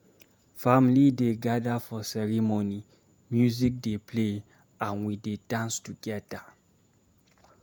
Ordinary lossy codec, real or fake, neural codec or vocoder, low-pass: none; fake; vocoder, 48 kHz, 128 mel bands, Vocos; none